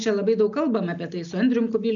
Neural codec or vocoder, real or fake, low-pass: none; real; 7.2 kHz